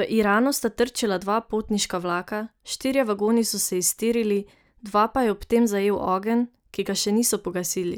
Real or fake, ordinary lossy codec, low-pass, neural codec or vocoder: real; none; none; none